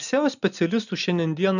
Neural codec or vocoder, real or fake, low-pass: none; real; 7.2 kHz